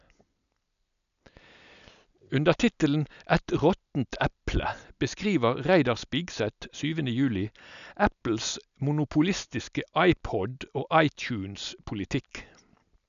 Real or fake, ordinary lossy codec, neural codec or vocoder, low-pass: real; none; none; 7.2 kHz